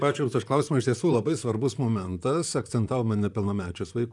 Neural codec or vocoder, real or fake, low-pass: vocoder, 44.1 kHz, 128 mel bands, Pupu-Vocoder; fake; 10.8 kHz